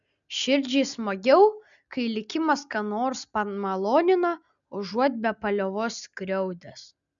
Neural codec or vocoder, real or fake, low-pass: none; real; 7.2 kHz